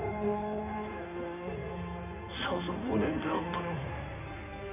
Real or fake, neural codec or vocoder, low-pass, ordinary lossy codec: fake; codec, 16 kHz in and 24 kHz out, 2.2 kbps, FireRedTTS-2 codec; 3.6 kHz; none